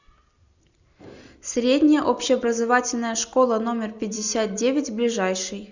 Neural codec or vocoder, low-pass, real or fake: none; 7.2 kHz; real